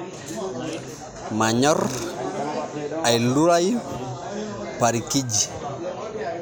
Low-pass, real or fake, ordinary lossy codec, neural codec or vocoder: none; real; none; none